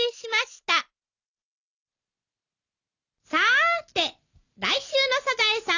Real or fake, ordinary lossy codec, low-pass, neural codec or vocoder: real; none; 7.2 kHz; none